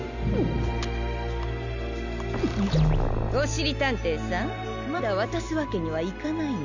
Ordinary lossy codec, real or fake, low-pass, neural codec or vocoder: none; real; 7.2 kHz; none